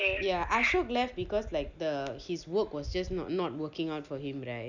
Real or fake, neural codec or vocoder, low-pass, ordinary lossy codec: real; none; 7.2 kHz; none